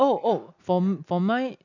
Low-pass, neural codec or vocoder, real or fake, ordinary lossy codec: 7.2 kHz; none; real; none